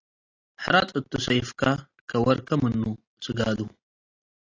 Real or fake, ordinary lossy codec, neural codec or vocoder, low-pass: real; AAC, 32 kbps; none; 7.2 kHz